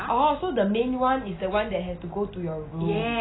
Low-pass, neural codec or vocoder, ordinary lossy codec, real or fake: 7.2 kHz; none; AAC, 16 kbps; real